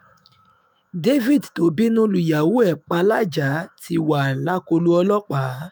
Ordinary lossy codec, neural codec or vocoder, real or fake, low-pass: none; autoencoder, 48 kHz, 128 numbers a frame, DAC-VAE, trained on Japanese speech; fake; none